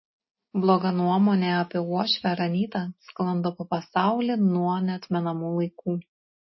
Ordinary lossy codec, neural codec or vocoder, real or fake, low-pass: MP3, 24 kbps; none; real; 7.2 kHz